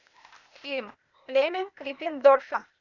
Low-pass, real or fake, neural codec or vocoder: 7.2 kHz; fake; codec, 16 kHz, 0.8 kbps, ZipCodec